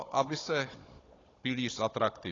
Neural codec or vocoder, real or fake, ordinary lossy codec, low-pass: codec, 16 kHz, 16 kbps, FunCodec, trained on Chinese and English, 50 frames a second; fake; AAC, 32 kbps; 7.2 kHz